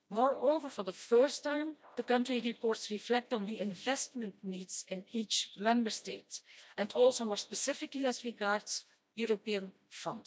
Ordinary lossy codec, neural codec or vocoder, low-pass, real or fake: none; codec, 16 kHz, 1 kbps, FreqCodec, smaller model; none; fake